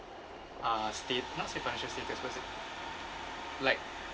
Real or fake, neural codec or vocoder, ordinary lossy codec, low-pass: real; none; none; none